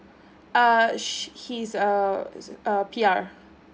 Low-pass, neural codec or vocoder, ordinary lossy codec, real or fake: none; none; none; real